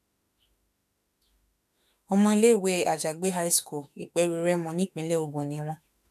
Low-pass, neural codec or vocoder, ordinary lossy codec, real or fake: 14.4 kHz; autoencoder, 48 kHz, 32 numbers a frame, DAC-VAE, trained on Japanese speech; none; fake